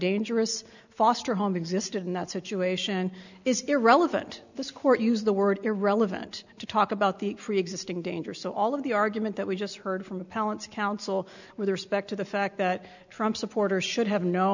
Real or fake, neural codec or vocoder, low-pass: real; none; 7.2 kHz